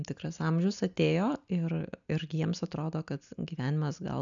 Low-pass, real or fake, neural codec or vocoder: 7.2 kHz; real; none